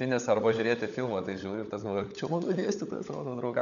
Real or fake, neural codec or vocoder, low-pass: fake; codec, 16 kHz, 16 kbps, FunCodec, trained on LibriTTS, 50 frames a second; 7.2 kHz